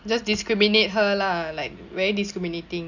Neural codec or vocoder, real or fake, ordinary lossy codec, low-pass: none; real; none; 7.2 kHz